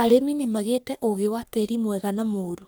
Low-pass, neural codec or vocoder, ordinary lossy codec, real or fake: none; codec, 44.1 kHz, 3.4 kbps, Pupu-Codec; none; fake